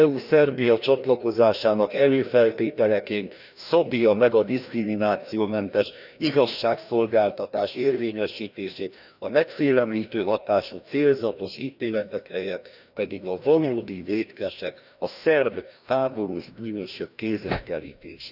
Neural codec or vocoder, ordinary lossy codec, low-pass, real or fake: codec, 16 kHz, 1 kbps, FreqCodec, larger model; none; 5.4 kHz; fake